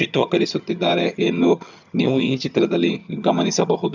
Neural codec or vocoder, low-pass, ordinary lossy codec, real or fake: vocoder, 22.05 kHz, 80 mel bands, HiFi-GAN; 7.2 kHz; none; fake